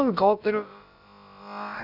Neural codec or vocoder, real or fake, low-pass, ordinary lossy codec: codec, 16 kHz, about 1 kbps, DyCAST, with the encoder's durations; fake; 5.4 kHz; AAC, 48 kbps